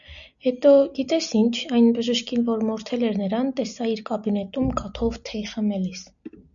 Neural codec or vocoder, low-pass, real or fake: none; 7.2 kHz; real